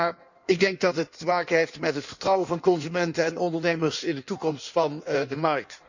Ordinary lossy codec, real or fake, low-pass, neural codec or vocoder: none; fake; 7.2 kHz; codec, 16 kHz in and 24 kHz out, 1.1 kbps, FireRedTTS-2 codec